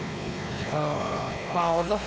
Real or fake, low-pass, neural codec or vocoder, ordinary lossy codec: fake; none; codec, 16 kHz, 2 kbps, X-Codec, WavLM features, trained on Multilingual LibriSpeech; none